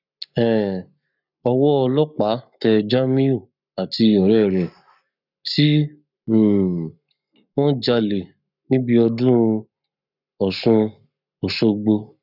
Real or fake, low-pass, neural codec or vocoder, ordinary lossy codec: fake; 5.4 kHz; codec, 44.1 kHz, 7.8 kbps, Pupu-Codec; none